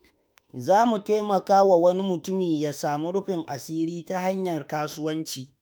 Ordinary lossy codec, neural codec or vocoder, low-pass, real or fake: none; autoencoder, 48 kHz, 32 numbers a frame, DAC-VAE, trained on Japanese speech; none; fake